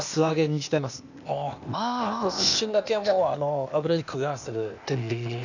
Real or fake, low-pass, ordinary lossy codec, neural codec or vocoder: fake; 7.2 kHz; none; codec, 16 kHz, 0.8 kbps, ZipCodec